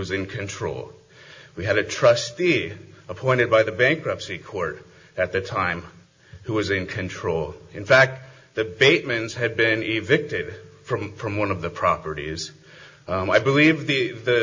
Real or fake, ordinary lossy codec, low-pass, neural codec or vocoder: real; MP3, 48 kbps; 7.2 kHz; none